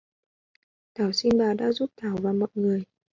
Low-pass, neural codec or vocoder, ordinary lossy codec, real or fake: 7.2 kHz; none; MP3, 48 kbps; real